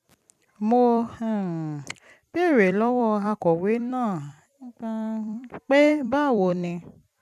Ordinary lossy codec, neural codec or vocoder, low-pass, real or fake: none; vocoder, 44.1 kHz, 128 mel bands every 256 samples, BigVGAN v2; 14.4 kHz; fake